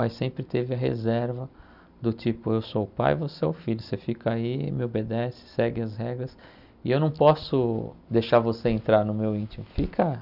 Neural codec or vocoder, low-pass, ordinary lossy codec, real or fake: none; 5.4 kHz; none; real